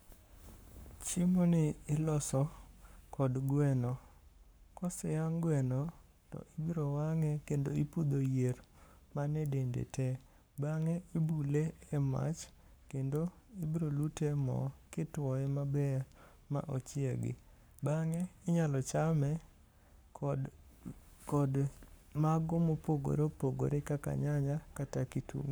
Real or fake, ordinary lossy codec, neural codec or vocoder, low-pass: fake; none; codec, 44.1 kHz, 7.8 kbps, Pupu-Codec; none